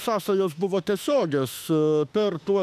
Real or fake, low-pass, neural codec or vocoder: fake; 14.4 kHz; autoencoder, 48 kHz, 32 numbers a frame, DAC-VAE, trained on Japanese speech